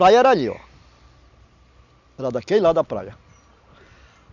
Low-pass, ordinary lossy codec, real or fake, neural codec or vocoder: 7.2 kHz; none; real; none